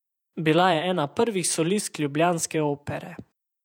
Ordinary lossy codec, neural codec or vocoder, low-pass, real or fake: none; vocoder, 48 kHz, 128 mel bands, Vocos; 19.8 kHz; fake